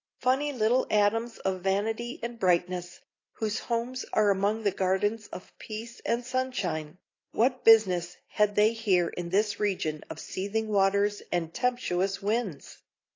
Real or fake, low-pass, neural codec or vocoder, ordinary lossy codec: real; 7.2 kHz; none; AAC, 32 kbps